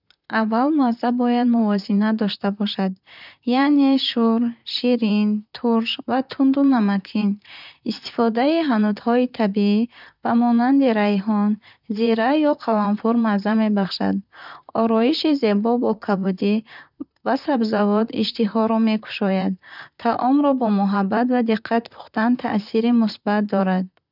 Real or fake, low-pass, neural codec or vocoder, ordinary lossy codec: fake; 5.4 kHz; vocoder, 44.1 kHz, 128 mel bands, Pupu-Vocoder; none